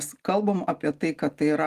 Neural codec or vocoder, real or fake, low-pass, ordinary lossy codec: none; real; 14.4 kHz; Opus, 24 kbps